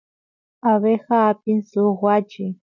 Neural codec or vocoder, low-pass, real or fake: none; 7.2 kHz; real